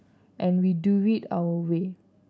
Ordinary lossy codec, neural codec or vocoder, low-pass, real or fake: none; none; none; real